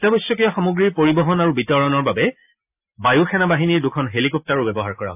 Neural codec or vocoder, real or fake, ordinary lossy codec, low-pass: none; real; AAC, 32 kbps; 3.6 kHz